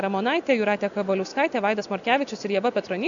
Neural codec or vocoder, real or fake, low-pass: none; real; 7.2 kHz